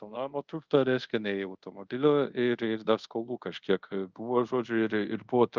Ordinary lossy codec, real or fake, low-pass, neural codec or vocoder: Opus, 24 kbps; fake; 7.2 kHz; codec, 24 kHz, 0.5 kbps, DualCodec